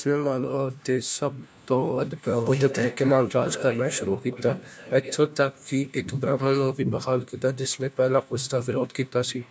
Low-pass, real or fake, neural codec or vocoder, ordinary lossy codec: none; fake; codec, 16 kHz, 1 kbps, FunCodec, trained on LibriTTS, 50 frames a second; none